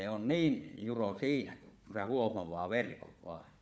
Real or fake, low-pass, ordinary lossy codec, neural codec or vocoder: fake; none; none; codec, 16 kHz, 4 kbps, FunCodec, trained on Chinese and English, 50 frames a second